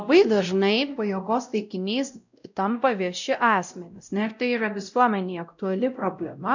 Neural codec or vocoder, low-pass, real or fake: codec, 16 kHz, 0.5 kbps, X-Codec, WavLM features, trained on Multilingual LibriSpeech; 7.2 kHz; fake